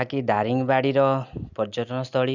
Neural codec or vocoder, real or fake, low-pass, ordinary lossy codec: none; real; 7.2 kHz; none